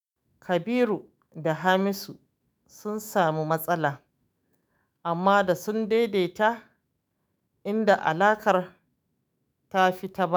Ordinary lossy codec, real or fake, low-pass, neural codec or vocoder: none; fake; none; autoencoder, 48 kHz, 128 numbers a frame, DAC-VAE, trained on Japanese speech